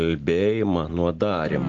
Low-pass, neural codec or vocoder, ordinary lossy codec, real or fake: 7.2 kHz; none; Opus, 16 kbps; real